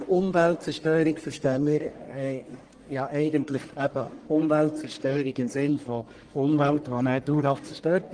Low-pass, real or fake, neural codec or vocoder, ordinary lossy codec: 9.9 kHz; fake; codec, 44.1 kHz, 1.7 kbps, Pupu-Codec; Opus, 24 kbps